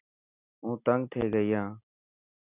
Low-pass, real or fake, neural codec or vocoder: 3.6 kHz; real; none